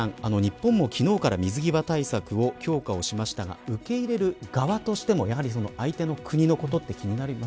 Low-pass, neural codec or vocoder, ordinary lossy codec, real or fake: none; none; none; real